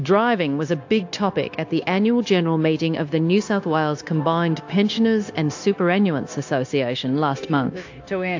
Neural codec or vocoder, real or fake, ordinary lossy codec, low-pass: codec, 16 kHz, 0.9 kbps, LongCat-Audio-Codec; fake; AAC, 48 kbps; 7.2 kHz